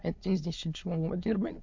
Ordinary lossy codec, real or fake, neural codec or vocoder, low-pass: MP3, 48 kbps; fake; autoencoder, 22.05 kHz, a latent of 192 numbers a frame, VITS, trained on many speakers; 7.2 kHz